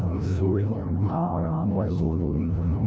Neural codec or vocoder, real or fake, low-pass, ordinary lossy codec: codec, 16 kHz, 0.5 kbps, FreqCodec, larger model; fake; none; none